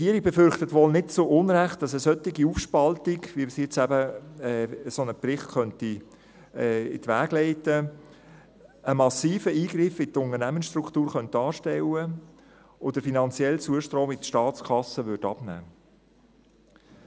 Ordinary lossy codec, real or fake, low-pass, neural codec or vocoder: none; real; none; none